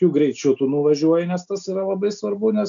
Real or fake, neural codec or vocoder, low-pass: real; none; 7.2 kHz